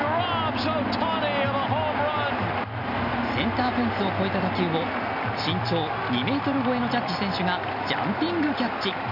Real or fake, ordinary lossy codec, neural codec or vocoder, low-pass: real; none; none; 5.4 kHz